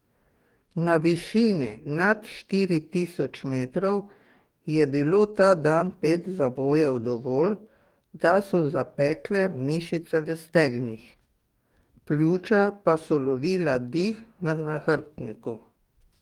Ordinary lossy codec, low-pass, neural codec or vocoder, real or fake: Opus, 32 kbps; 19.8 kHz; codec, 44.1 kHz, 2.6 kbps, DAC; fake